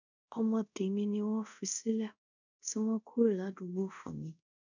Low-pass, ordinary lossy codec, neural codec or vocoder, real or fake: 7.2 kHz; none; codec, 24 kHz, 0.5 kbps, DualCodec; fake